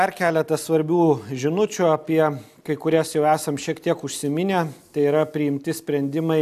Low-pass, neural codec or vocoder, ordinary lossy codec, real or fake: 14.4 kHz; none; AAC, 96 kbps; real